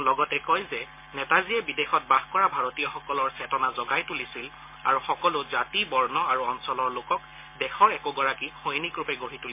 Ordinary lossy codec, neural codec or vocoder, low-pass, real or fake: MP3, 24 kbps; none; 3.6 kHz; real